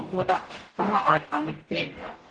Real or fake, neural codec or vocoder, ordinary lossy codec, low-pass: fake; codec, 44.1 kHz, 0.9 kbps, DAC; Opus, 16 kbps; 9.9 kHz